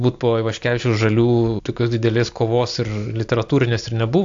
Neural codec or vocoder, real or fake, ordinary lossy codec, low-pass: none; real; AAC, 64 kbps; 7.2 kHz